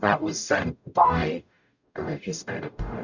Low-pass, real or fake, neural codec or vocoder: 7.2 kHz; fake; codec, 44.1 kHz, 0.9 kbps, DAC